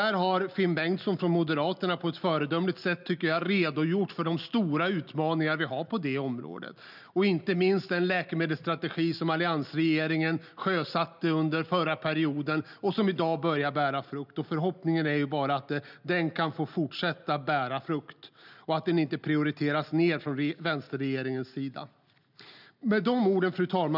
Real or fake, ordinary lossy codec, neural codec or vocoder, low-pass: real; none; none; 5.4 kHz